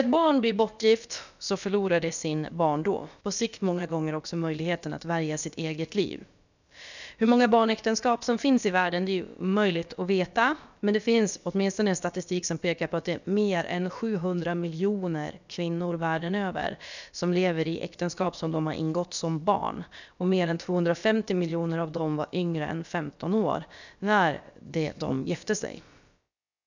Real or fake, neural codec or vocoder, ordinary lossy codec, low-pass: fake; codec, 16 kHz, about 1 kbps, DyCAST, with the encoder's durations; none; 7.2 kHz